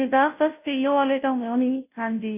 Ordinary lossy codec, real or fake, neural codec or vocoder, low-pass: none; fake; codec, 16 kHz, 0.5 kbps, FunCodec, trained on Chinese and English, 25 frames a second; 3.6 kHz